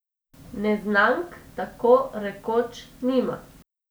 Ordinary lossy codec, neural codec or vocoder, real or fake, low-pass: none; none; real; none